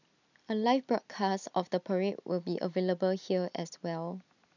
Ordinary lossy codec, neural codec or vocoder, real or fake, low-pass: none; none; real; 7.2 kHz